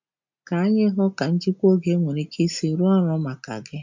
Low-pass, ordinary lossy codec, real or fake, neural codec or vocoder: 7.2 kHz; MP3, 64 kbps; real; none